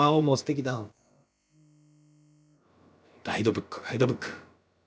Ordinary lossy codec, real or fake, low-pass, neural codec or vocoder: none; fake; none; codec, 16 kHz, about 1 kbps, DyCAST, with the encoder's durations